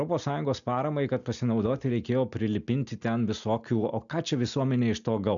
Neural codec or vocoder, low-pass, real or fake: none; 7.2 kHz; real